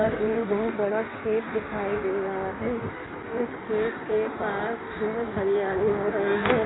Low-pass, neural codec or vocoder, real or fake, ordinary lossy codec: 7.2 kHz; codec, 16 kHz in and 24 kHz out, 1.1 kbps, FireRedTTS-2 codec; fake; AAC, 16 kbps